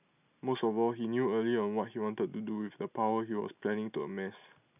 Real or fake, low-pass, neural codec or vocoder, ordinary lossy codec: real; 3.6 kHz; none; none